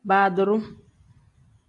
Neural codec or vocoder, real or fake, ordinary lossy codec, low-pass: none; real; AAC, 48 kbps; 10.8 kHz